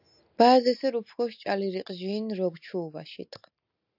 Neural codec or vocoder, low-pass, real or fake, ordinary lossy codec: none; 5.4 kHz; real; AAC, 48 kbps